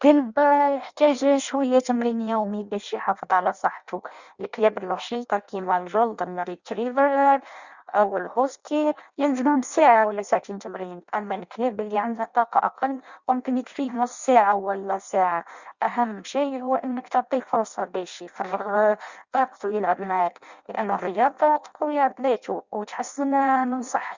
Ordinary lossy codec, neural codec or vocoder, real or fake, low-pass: Opus, 64 kbps; codec, 16 kHz in and 24 kHz out, 0.6 kbps, FireRedTTS-2 codec; fake; 7.2 kHz